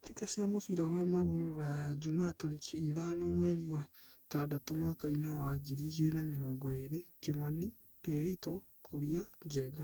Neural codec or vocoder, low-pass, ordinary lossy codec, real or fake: codec, 44.1 kHz, 2.6 kbps, DAC; none; none; fake